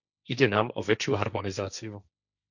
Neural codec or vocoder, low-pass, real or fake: codec, 16 kHz, 1.1 kbps, Voila-Tokenizer; 7.2 kHz; fake